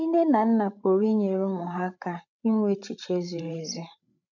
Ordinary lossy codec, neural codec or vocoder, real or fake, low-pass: none; codec, 16 kHz, 16 kbps, FreqCodec, larger model; fake; 7.2 kHz